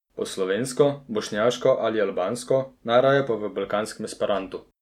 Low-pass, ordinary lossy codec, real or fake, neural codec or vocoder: 19.8 kHz; none; real; none